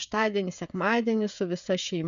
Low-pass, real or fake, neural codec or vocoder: 7.2 kHz; fake; codec, 16 kHz, 16 kbps, FreqCodec, smaller model